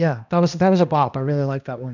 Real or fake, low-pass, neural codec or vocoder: fake; 7.2 kHz; codec, 16 kHz, 1 kbps, X-Codec, HuBERT features, trained on balanced general audio